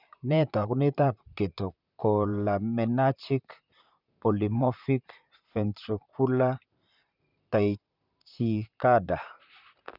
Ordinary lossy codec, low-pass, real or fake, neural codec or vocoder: none; 5.4 kHz; fake; vocoder, 44.1 kHz, 128 mel bands, Pupu-Vocoder